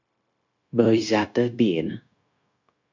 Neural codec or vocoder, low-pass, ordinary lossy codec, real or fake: codec, 16 kHz, 0.9 kbps, LongCat-Audio-Codec; 7.2 kHz; MP3, 64 kbps; fake